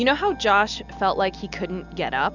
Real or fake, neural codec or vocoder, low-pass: real; none; 7.2 kHz